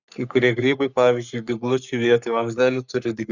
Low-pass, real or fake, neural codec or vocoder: 7.2 kHz; fake; codec, 44.1 kHz, 3.4 kbps, Pupu-Codec